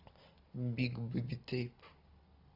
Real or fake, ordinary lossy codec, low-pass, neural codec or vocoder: real; AAC, 48 kbps; 5.4 kHz; none